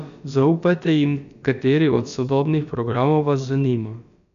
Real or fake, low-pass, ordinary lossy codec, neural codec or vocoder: fake; 7.2 kHz; none; codec, 16 kHz, about 1 kbps, DyCAST, with the encoder's durations